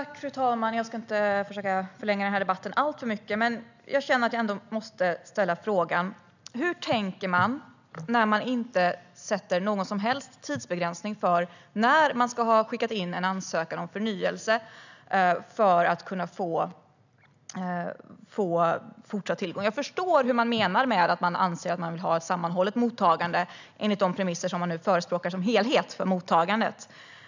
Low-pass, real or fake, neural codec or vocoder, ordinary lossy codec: 7.2 kHz; real; none; none